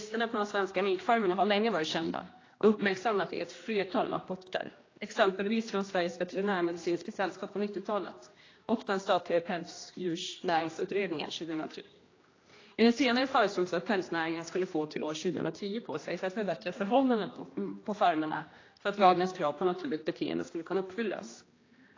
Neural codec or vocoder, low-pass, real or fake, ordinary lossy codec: codec, 16 kHz, 1 kbps, X-Codec, HuBERT features, trained on general audio; 7.2 kHz; fake; AAC, 32 kbps